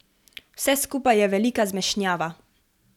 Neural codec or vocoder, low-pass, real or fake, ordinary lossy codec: none; 19.8 kHz; real; none